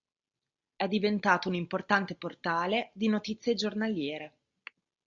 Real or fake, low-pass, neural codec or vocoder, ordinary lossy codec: real; 7.2 kHz; none; MP3, 48 kbps